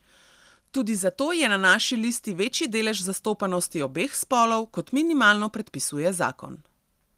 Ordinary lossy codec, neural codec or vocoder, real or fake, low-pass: Opus, 24 kbps; none; real; 14.4 kHz